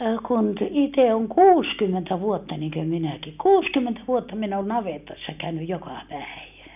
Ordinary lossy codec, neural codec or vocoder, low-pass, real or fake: none; none; 3.6 kHz; real